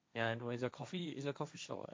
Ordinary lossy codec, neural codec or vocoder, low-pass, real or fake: none; codec, 16 kHz, 1.1 kbps, Voila-Tokenizer; none; fake